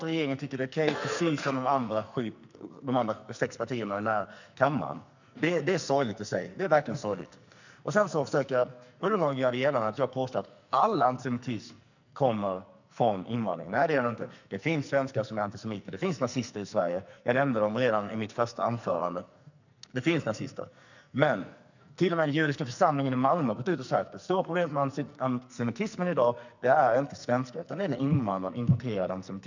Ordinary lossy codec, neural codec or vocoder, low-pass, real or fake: none; codec, 44.1 kHz, 2.6 kbps, SNAC; 7.2 kHz; fake